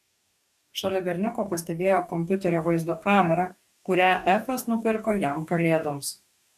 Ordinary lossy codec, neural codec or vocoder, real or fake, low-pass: MP3, 96 kbps; codec, 44.1 kHz, 2.6 kbps, DAC; fake; 14.4 kHz